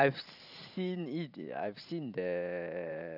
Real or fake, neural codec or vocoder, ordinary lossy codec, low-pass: fake; vocoder, 44.1 kHz, 128 mel bands every 512 samples, BigVGAN v2; none; 5.4 kHz